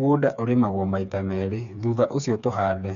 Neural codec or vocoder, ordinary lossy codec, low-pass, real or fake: codec, 16 kHz, 4 kbps, FreqCodec, smaller model; none; 7.2 kHz; fake